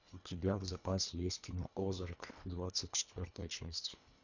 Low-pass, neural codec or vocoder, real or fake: 7.2 kHz; codec, 24 kHz, 1.5 kbps, HILCodec; fake